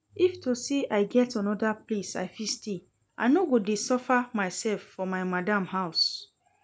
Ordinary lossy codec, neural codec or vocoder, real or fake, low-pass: none; none; real; none